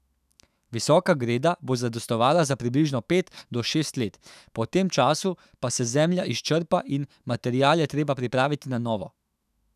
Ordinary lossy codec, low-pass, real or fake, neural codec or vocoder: none; 14.4 kHz; fake; autoencoder, 48 kHz, 128 numbers a frame, DAC-VAE, trained on Japanese speech